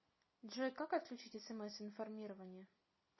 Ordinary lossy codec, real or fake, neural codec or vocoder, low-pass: MP3, 24 kbps; real; none; 7.2 kHz